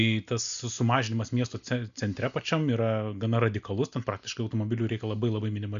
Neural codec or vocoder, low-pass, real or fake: none; 7.2 kHz; real